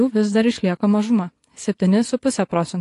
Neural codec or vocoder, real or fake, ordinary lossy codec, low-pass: vocoder, 24 kHz, 100 mel bands, Vocos; fake; AAC, 48 kbps; 10.8 kHz